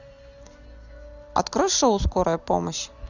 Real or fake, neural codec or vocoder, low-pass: real; none; 7.2 kHz